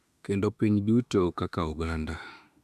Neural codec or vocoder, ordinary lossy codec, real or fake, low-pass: autoencoder, 48 kHz, 32 numbers a frame, DAC-VAE, trained on Japanese speech; none; fake; 14.4 kHz